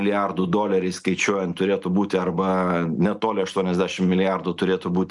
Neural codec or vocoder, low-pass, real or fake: none; 10.8 kHz; real